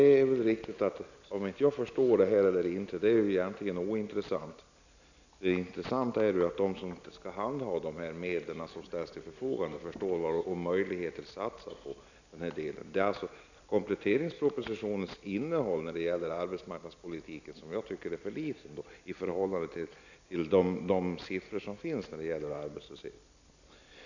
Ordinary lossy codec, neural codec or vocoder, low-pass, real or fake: none; none; 7.2 kHz; real